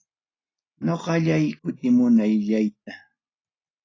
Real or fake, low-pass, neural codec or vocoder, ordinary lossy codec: real; 7.2 kHz; none; AAC, 32 kbps